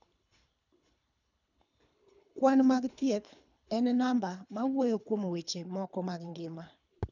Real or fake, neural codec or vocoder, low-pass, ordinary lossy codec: fake; codec, 24 kHz, 3 kbps, HILCodec; 7.2 kHz; none